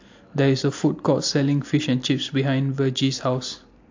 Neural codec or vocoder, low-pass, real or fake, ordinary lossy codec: none; 7.2 kHz; real; AAC, 48 kbps